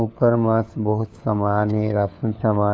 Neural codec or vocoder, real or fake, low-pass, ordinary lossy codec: codec, 16 kHz, 4 kbps, FunCodec, trained on LibriTTS, 50 frames a second; fake; none; none